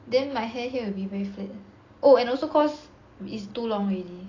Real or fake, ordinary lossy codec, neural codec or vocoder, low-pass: real; AAC, 32 kbps; none; 7.2 kHz